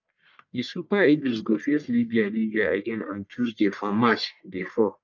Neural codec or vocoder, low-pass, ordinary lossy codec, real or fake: codec, 44.1 kHz, 1.7 kbps, Pupu-Codec; 7.2 kHz; none; fake